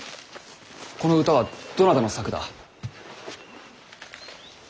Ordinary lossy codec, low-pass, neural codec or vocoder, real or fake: none; none; none; real